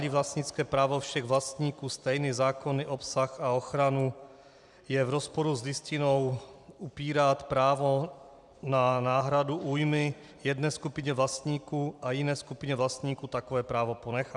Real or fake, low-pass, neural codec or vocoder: fake; 10.8 kHz; vocoder, 44.1 kHz, 128 mel bands every 256 samples, BigVGAN v2